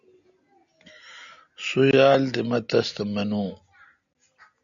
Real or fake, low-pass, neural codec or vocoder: real; 7.2 kHz; none